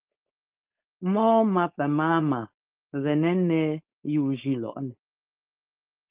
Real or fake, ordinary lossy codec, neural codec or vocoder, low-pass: fake; Opus, 32 kbps; codec, 16 kHz, 4.8 kbps, FACodec; 3.6 kHz